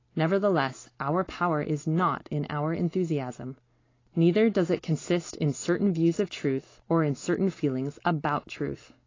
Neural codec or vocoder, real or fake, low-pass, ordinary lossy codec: none; real; 7.2 kHz; AAC, 32 kbps